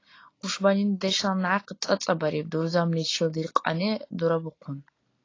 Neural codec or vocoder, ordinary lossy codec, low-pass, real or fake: none; AAC, 32 kbps; 7.2 kHz; real